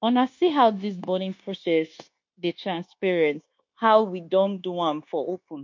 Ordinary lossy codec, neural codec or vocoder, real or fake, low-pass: MP3, 48 kbps; codec, 16 kHz, 0.9 kbps, LongCat-Audio-Codec; fake; 7.2 kHz